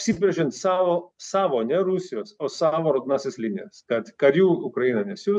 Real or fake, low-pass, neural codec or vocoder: real; 10.8 kHz; none